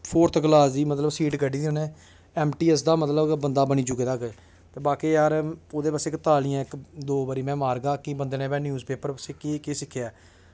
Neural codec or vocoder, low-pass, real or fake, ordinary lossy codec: none; none; real; none